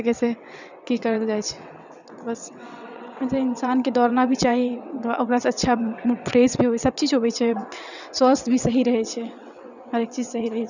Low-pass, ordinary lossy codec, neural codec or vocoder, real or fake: 7.2 kHz; none; vocoder, 22.05 kHz, 80 mel bands, WaveNeXt; fake